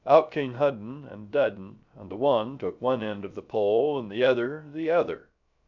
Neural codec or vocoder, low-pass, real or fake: codec, 16 kHz, 0.3 kbps, FocalCodec; 7.2 kHz; fake